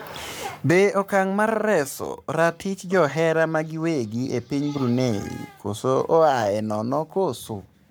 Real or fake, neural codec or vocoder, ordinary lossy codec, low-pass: fake; codec, 44.1 kHz, 7.8 kbps, Pupu-Codec; none; none